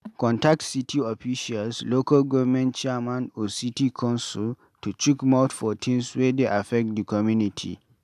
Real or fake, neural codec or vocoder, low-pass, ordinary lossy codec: real; none; 14.4 kHz; AAC, 96 kbps